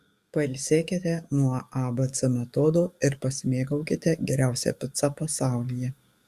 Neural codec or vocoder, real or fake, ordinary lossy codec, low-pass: codec, 44.1 kHz, 7.8 kbps, DAC; fake; Opus, 64 kbps; 14.4 kHz